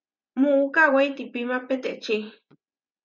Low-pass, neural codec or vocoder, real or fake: 7.2 kHz; vocoder, 24 kHz, 100 mel bands, Vocos; fake